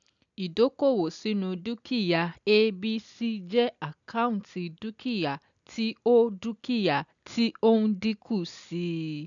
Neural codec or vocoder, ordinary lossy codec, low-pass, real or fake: none; none; 7.2 kHz; real